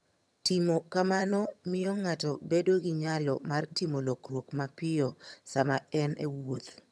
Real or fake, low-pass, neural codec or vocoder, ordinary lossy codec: fake; none; vocoder, 22.05 kHz, 80 mel bands, HiFi-GAN; none